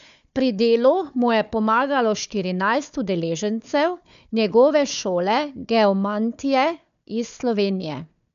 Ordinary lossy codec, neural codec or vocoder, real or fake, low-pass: none; codec, 16 kHz, 4 kbps, FunCodec, trained on Chinese and English, 50 frames a second; fake; 7.2 kHz